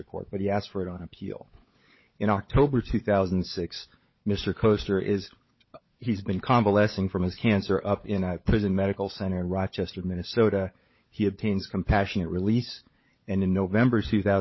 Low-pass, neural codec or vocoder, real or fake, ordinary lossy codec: 7.2 kHz; codec, 16 kHz, 16 kbps, FunCodec, trained on LibriTTS, 50 frames a second; fake; MP3, 24 kbps